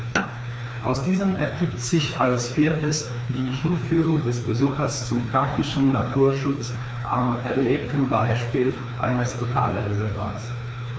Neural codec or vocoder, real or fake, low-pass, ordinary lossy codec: codec, 16 kHz, 2 kbps, FreqCodec, larger model; fake; none; none